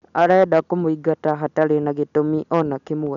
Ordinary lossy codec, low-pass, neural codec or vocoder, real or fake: MP3, 96 kbps; 7.2 kHz; none; real